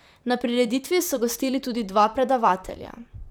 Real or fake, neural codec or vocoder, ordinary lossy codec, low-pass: fake; vocoder, 44.1 kHz, 128 mel bands, Pupu-Vocoder; none; none